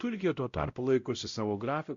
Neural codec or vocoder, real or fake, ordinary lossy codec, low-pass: codec, 16 kHz, 0.5 kbps, X-Codec, WavLM features, trained on Multilingual LibriSpeech; fake; Opus, 64 kbps; 7.2 kHz